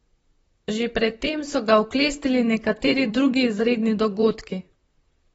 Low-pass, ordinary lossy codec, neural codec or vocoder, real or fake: 19.8 kHz; AAC, 24 kbps; vocoder, 44.1 kHz, 128 mel bands, Pupu-Vocoder; fake